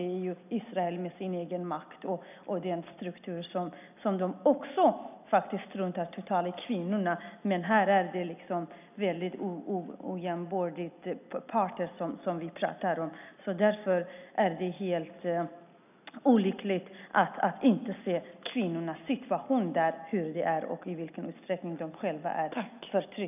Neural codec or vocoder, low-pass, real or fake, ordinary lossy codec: none; 3.6 kHz; real; none